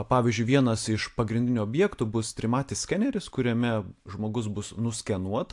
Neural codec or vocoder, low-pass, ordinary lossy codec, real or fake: none; 10.8 kHz; AAC, 64 kbps; real